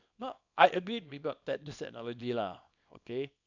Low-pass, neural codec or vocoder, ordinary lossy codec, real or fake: 7.2 kHz; codec, 24 kHz, 0.9 kbps, WavTokenizer, small release; none; fake